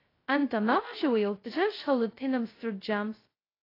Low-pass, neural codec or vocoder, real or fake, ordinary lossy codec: 5.4 kHz; codec, 16 kHz, 0.2 kbps, FocalCodec; fake; AAC, 24 kbps